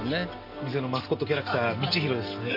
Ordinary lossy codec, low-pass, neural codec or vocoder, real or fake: none; 5.4 kHz; none; real